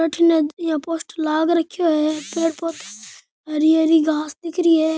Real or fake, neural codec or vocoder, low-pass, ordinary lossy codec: real; none; none; none